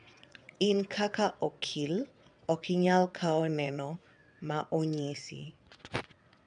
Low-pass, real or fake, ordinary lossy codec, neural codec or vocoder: 9.9 kHz; fake; none; vocoder, 22.05 kHz, 80 mel bands, WaveNeXt